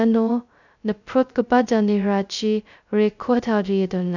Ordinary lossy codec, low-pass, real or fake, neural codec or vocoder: none; 7.2 kHz; fake; codec, 16 kHz, 0.2 kbps, FocalCodec